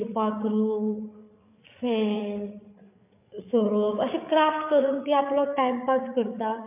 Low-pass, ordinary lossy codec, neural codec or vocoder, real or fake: 3.6 kHz; MP3, 32 kbps; codec, 16 kHz, 8 kbps, FreqCodec, larger model; fake